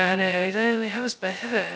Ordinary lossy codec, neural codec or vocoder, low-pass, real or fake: none; codec, 16 kHz, 0.2 kbps, FocalCodec; none; fake